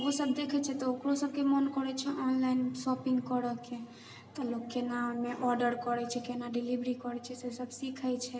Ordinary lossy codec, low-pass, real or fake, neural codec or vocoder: none; none; real; none